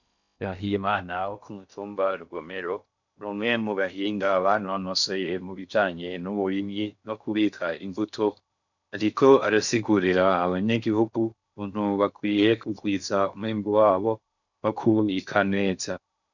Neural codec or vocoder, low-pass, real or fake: codec, 16 kHz in and 24 kHz out, 0.6 kbps, FocalCodec, streaming, 4096 codes; 7.2 kHz; fake